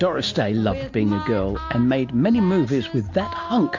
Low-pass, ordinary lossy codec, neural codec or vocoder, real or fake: 7.2 kHz; MP3, 48 kbps; none; real